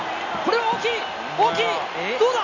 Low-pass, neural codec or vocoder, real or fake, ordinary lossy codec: 7.2 kHz; none; real; none